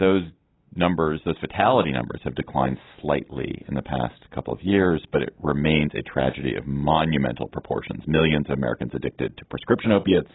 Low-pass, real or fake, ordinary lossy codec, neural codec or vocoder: 7.2 kHz; real; AAC, 16 kbps; none